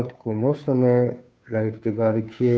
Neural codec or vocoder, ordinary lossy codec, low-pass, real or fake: codec, 16 kHz, 2 kbps, FunCodec, trained on Chinese and English, 25 frames a second; none; none; fake